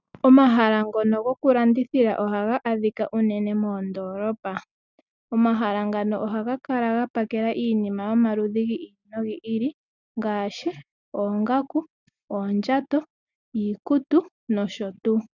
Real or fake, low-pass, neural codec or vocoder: real; 7.2 kHz; none